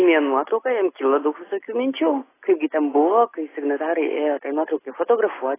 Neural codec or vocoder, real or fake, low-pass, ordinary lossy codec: none; real; 3.6 kHz; AAC, 16 kbps